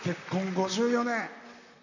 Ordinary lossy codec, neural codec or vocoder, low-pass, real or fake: none; vocoder, 44.1 kHz, 128 mel bands, Pupu-Vocoder; 7.2 kHz; fake